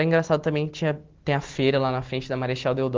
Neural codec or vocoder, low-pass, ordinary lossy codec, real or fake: none; 7.2 kHz; Opus, 16 kbps; real